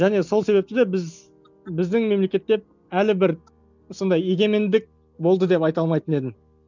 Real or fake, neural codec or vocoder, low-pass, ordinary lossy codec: real; none; 7.2 kHz; none